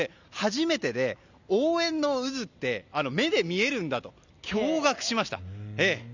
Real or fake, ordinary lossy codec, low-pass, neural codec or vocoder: real; none; 7.2 kHz; none